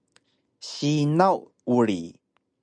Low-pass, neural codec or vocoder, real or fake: 9.9 kHz; none; real